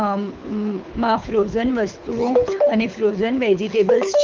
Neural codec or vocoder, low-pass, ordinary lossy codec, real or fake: codec, 24 kHz, 6 kbps, HILCodec; 7.2 kHz; Opus, 32 kbps; fake